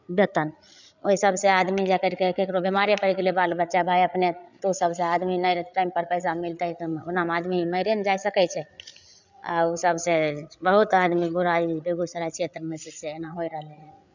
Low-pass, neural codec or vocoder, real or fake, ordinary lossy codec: 7.2 kHz; codec, 16 kHz, 8 kbps, FreqCodec, larger model; fake; none